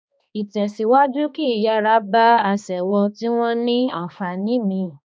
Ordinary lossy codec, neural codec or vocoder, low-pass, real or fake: none; codec, 16 kHz, 2 kbps, X-Codec, HuBERT features, trained on balanced general audio; none; fake